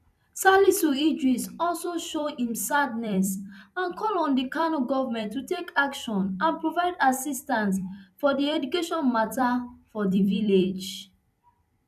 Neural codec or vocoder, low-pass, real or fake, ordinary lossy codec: vocoder, 44.1 kHz, 128 mel bands every 256 samples, BigVGAN v2; 14.4 kHz; fake; none